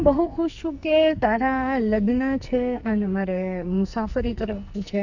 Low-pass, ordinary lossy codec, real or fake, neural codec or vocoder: 7.2 kHz; none; fake; codec, 44.1 kHz, 2.6 kbps, SNAC